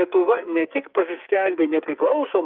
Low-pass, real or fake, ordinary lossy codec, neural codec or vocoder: 5.4 kHz; fake; Opus, 24 kbps; codec, 44.1 kHz, 2.6 kbps, SNAC